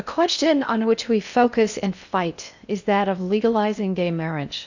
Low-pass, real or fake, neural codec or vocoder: 7.2 kHz; fake; codec, 16 kHz in and 24 kHz out, 0.6 kbps, FocalCodec, streaming, 4096 codes